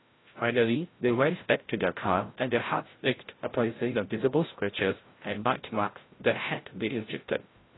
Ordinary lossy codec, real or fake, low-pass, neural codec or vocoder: AAC, 16 kbps; fake; 7.2 kHz; codec, 16 kHz, 0.5 kbps, FreqCodec, larger model